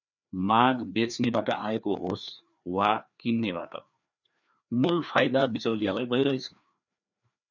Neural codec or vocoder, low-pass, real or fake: codec, 16 kHz, 2 kbps, FreqCodec, larger model; 7.2 kHz; fake